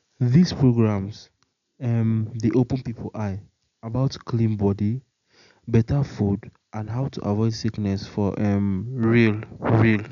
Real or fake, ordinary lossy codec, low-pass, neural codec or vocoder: real; none; 7.2 kHz; none